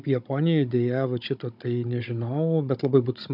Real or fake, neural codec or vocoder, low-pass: fake; codec, 16 kHz, 16 kbps, FunCodec, trained on Chinese and English, 50 frames a second; 5.4 kHz